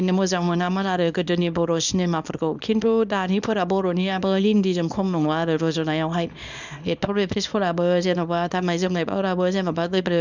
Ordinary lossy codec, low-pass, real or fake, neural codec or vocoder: none; 7.2 kHz; fake; codec, 24 kHz, 0.9 kbps, WavTokenizer, small release